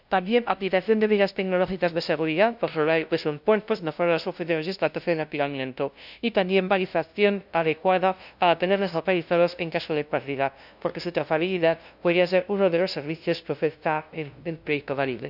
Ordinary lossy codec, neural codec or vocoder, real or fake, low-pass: none; codec, 16 kHz, 0.5 kbps, FunCodec, trained on LibriTTS, 25 frames a second; fake; 5.4 kHz